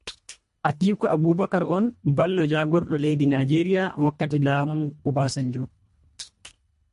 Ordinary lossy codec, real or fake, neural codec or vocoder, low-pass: MP3, 48 kbps; fake; codec, 24 kHz, 1.5 kbps, HILCodec; 10.8 kHz